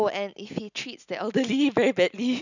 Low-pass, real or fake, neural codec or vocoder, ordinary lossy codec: 7.2 kHz; real; none; none